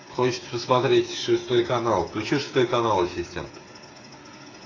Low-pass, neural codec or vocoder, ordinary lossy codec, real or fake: 7.2 kHz; codec, 16 kHz, 8 kbps, FreqCodec, smaller model; AAC, 32 kbps; fake